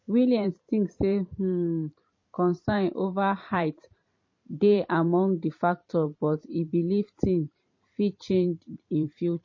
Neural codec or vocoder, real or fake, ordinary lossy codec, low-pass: vocoder, 44.1 kHz, 128 mel bands every 512 samples, BigVGAN v2; fake; MP3, 32 kbps; 7.2 kHz